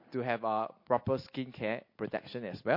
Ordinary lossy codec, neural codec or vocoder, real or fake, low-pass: MP3, 32 kbps; none; real; 5.4 kHz